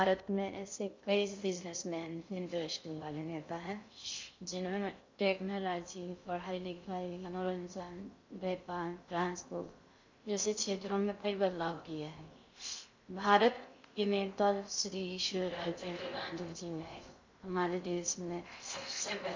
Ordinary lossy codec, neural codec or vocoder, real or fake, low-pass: MP3, 64 kbps; codec, 16 kHz in and 24 kHz out, 0.6 kbps, FocalCodec, streaming, 2048 codes; fake; 7.2 kHz